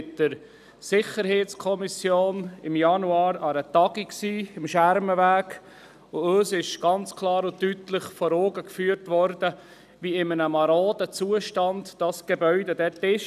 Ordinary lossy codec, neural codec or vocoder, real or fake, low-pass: none; none; real; 14.4 kHz